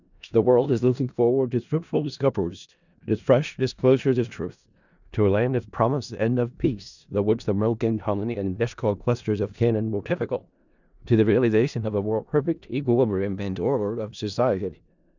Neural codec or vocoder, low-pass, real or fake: codec, 16 kHz in and 24 kHz out, 0.4 kbps, LongCat-Audio-Codec, four codebook decoder; 7.2 kHz; fake